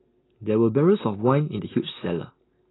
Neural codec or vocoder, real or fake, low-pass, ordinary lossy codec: none; real; 7.2 kHz; AAC, 16 kbps